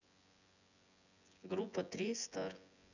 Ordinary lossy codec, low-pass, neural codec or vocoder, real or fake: none; 7.2 kHz; vocoder, 24 kHz, 100 mel bands, Vocos; fake